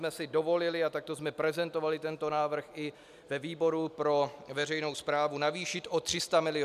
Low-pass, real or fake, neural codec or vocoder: 14.4 kHz; real; none